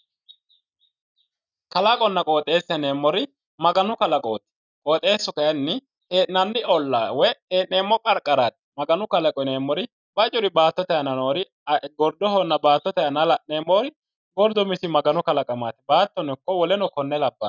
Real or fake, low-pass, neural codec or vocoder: real; 7.2 kHz; none